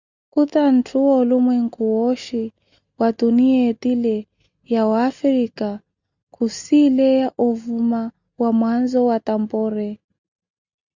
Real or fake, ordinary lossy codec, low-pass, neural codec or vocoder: real; Opus, 64 kbps; 7.2 kHz; none